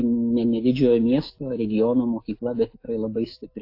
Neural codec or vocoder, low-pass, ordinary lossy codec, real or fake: autoencoder, 48 kHz, 128 numbers a frame, DAC-VAE, trained on Japanese speech; 5.4 kHz; AAC, 32 kbps; fake